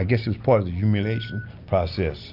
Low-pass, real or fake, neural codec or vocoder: 5.4 kHz; real; none